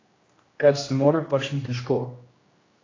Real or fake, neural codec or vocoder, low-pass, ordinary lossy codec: fake; codec, 16 kHz, 1 kbps, X-Codec, HuBERT features, trained on general audio; 7.2 kHz; AAC, 32 kbps